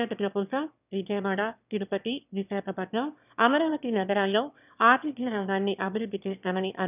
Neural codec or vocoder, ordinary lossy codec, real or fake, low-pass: autoencoder, 22.05 kHz, a latent of 192 numbers a frame, VITS, trained on one speaker; none; fake; 3.6 kHz